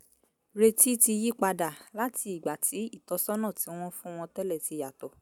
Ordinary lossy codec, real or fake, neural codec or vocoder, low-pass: none; real; none; none